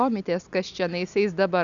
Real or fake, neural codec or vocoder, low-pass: real; none; 7.2 kHz